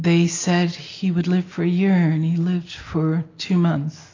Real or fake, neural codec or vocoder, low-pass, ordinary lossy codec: real; none; 7.2 kHz; AAC, 32 kbps